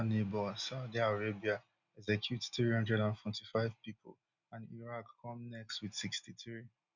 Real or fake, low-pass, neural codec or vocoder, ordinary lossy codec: real; 7.2 kHz; none; none